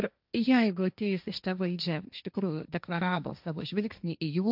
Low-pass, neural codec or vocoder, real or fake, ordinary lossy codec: 5.4 kHz; codec, 16 kHz, 1.1 kbps, Voila-Tokenizer; fake; AAC, 48 kbps